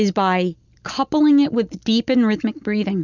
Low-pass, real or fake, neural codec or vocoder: 7.2 kHz; real; none